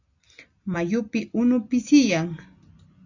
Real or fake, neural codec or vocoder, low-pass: real; none; 7.2 kHz